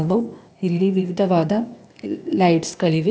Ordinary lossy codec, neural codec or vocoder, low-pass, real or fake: none; codec, 16 kHz, 0.8 kbps, ZipCodec; none; fake